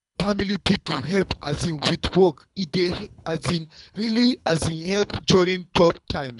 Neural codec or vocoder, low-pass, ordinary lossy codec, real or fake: codec, 24 kHz, 3 kbps, HILCodec; 10.8 kHz; none; fake